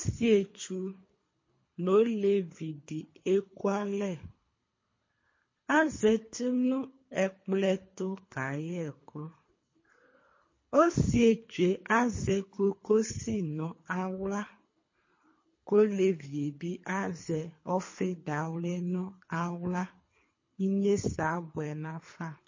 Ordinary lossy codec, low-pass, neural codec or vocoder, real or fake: MP3, 32 kbps; 7.2 kHz; codec, 24 kHz, 3 kbps, HILCodec; fake